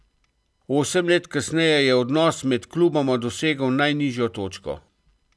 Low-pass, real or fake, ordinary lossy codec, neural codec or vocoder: none; real; none; none